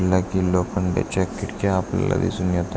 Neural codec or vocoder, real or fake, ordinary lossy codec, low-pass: none; real; none; none